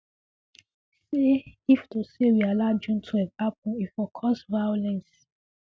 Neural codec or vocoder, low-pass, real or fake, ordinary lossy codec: none; none; real; none